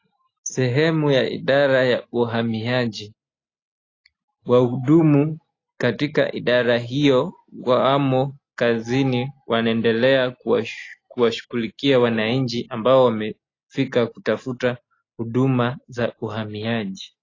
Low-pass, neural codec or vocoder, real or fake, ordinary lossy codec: 7.2 kHz; none; real; AAC, 32 kbps